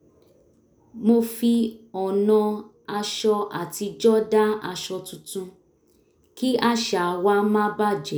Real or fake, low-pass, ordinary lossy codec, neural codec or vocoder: real; none; none; none